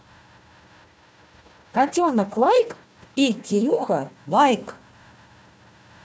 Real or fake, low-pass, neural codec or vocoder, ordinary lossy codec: fake; none; codec, 16 kHz, 1 kbps, FunCodec, trained on Chinese and English, 50 frames a second; none